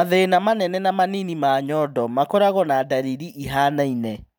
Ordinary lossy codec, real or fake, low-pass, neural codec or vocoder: none; fake; none; vocoder, 44.1 kHz, 128 mel bands every 512 samples, BigVGAN v2